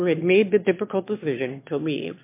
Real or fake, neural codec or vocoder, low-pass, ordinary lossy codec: fake; autoencoder, 22.05 kHz, a latent of 192 numbers a frame, VITS, trained on one speaker; 3.6 kHz; MP3, 24 kbps